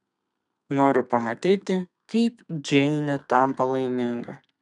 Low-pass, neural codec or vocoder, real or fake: 10.8 kHz; codec, 32 kHz, 1.9 kbps, SNAC; fake